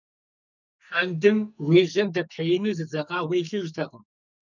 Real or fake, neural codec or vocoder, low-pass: fake; codec, 44.1 kHz, 2.6 kbps, SNAC; 7.2 kHz